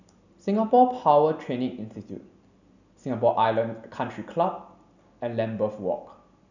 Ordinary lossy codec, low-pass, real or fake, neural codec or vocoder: none; 7.2 kHz; real; none